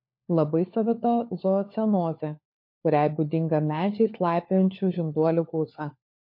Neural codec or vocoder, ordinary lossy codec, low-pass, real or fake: codec, 16 kHz, 4 kbps, FunCodec, trained on LibriTTS, 50 frames a second; MP3, 32 kbps; 5.4 kHz; fake